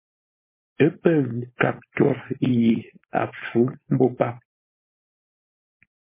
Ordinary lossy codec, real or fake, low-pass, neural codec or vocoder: MP3, 16 kbps; fake; 3.6 kHz; codec, 16 kHz, 4.8 kbps, FACodec